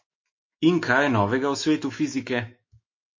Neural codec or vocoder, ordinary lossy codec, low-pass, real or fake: autoencoder, 48 kHz, 128 numbers a frame, DAC-VAE, trained on Japanese speech; MP3, 32 kbps; 7.2 kHz; fake